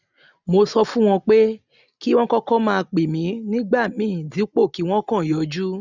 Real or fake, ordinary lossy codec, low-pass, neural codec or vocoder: real; none; 7.2 kHz; none